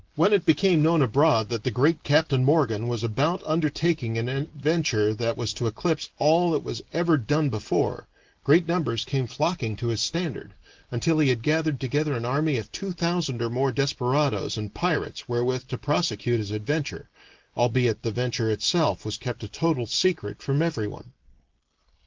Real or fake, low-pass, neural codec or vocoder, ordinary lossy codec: real; 7.2 kHz; none; Opus, 16 kbps